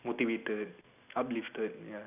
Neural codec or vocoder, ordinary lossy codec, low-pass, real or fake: none; none; 3.6 kHz; real